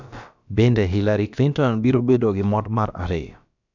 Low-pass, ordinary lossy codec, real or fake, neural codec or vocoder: 7.2 kHz; none; fake; codec, 16 kHz, about 1 kbps, DyCAST, with the encoder's durations